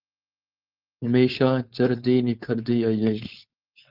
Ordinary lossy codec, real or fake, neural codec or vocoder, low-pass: Opus, 32 kbps; fake; codec, 16 kHz, 4.8 kbps, FACodec; 5.4 kHz